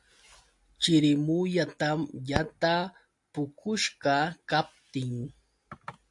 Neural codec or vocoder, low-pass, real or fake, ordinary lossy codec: none; 10.8 kHz; real; AAC, 64 kbps